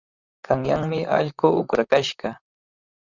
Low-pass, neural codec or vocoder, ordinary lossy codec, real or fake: 7.2 kHz; vocoder, 44.1 kHz, 128 mel bands, Pupu-Vocoder; Opus, 64 kbps; fake